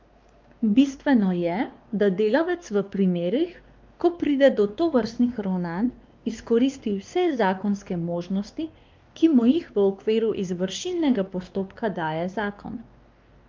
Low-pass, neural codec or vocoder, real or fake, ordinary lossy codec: 7.2 kHz; codec, 16 kHz, 2 kbps, X-Codec, WavLM features, trained on Multilingual LibriSpeech; fake; Opus, 24 kbps